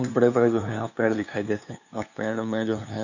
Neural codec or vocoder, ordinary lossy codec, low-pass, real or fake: codec, 16 kHz, 2 kbps, FunCodec, trained on LibriTTS, 25 frames a second; none; 7.2 kHz; fake